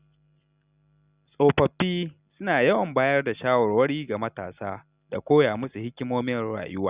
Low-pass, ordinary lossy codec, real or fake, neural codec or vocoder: 3.6 kHz; Opus, 64 kbps; real; none